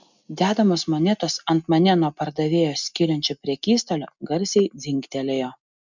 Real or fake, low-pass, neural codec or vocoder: real; 7.2 kHz; none